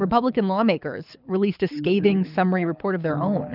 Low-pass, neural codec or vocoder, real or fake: 5.4 kHz; codec, 24 kHz, 6 kbps, HILCodec; fake